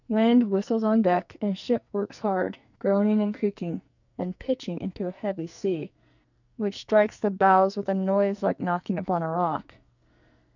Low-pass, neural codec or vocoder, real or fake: 7.2 kHz; codec, 44.1 kHz, 2.6 kbps, SNAC; fake